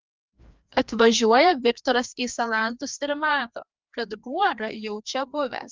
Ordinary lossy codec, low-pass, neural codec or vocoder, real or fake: Opus, 32 kbps; 7.2 kHz; codec, 16 kHz, 2 kbps, FreqCodec, larger model; fake